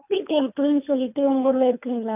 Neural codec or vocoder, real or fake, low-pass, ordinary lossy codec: codec, 24 kHz, 3 kbps, HILCodec; fake; 3.6 kHz; none